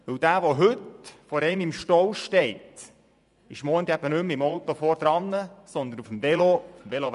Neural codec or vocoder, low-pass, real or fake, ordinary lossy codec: vocoder, 24 kHz, 100 mel bands, Vocos; 10.8 kHz; fake; none